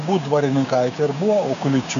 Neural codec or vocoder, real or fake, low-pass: codec, 16 kHz, 6 kbps, DAC; fake; 7.2 kHz